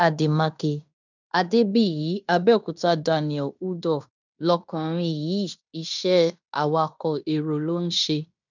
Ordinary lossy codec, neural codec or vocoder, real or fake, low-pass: none; codec, 16 kHz in and 24 kHz out, 0.9 kbps, LongCat-Audio-Codec, fine tuned four codebook decoder; fake; 7.2 kHz